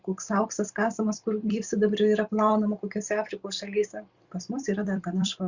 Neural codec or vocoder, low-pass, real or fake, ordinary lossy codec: vocoder, 44.1 kHz, 128 mel bands every 256 samples, BigVGAN v2; 7.2 kHz; fake; Opus, 64 kbps